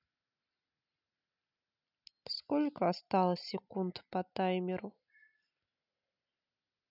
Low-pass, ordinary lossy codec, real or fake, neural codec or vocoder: 5.4 kHz; none; real; none